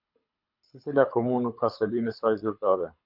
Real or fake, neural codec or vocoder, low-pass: fake; codec, 24 kHz, 6 kbps, HILCodec; 5.4 kHz